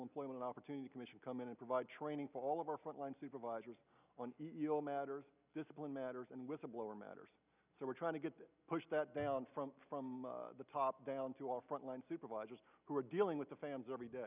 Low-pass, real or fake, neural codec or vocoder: 3.6 kHz; real; none